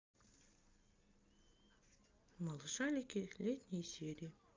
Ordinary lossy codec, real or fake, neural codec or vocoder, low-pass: Opus, 24 kbps; real; none; 7.2 kHz